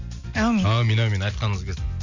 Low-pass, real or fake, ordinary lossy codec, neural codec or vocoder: 7.2 kHz; real; none; none